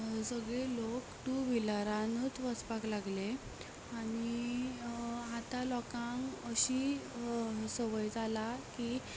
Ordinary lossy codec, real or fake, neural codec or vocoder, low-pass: none; real; none; none